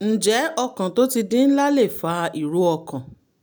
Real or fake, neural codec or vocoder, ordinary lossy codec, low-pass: real; none; none; none